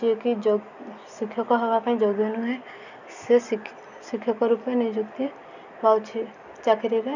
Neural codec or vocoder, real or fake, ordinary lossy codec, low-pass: none; real; none; 7.2 kHz